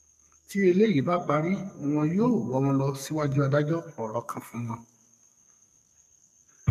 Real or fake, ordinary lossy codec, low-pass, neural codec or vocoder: fake; none; 14.4 kHz; codec, 44.1 kHz, 2.6 kbps, SNAC